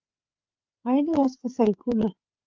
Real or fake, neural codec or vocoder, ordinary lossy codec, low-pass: fake; codec, 16 kHz, 4 kbps, FreqCodec, larger model; Opus, 32 kbps; 7.2 kHz